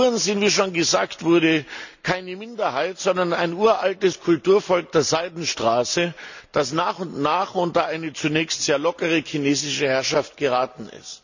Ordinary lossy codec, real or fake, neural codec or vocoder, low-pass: none; real; none; 7.2 kHz